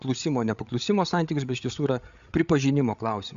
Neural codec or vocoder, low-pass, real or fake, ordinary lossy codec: codec, 16 kHz, 8 kbps, FreqCodec, larger model; 7.2 kHz; fake; Opus, 64 kbps